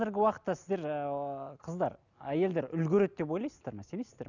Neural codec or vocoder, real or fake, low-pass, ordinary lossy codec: none; real; 7.2 kHz; none